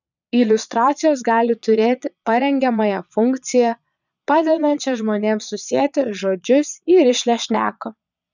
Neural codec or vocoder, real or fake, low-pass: vocoder, 22.05 kHz, 80 mel bands, Vocos; fake; 7.2 kHz